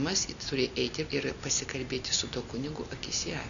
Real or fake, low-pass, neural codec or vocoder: real; 7.2 kHz; none